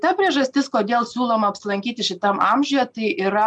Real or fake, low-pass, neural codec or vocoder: real; 10.8 kHz; none